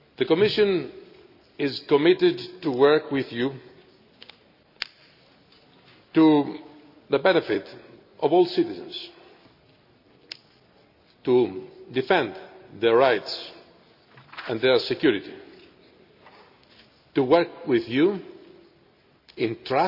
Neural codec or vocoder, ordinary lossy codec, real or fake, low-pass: none; none; real; 5.4 kHz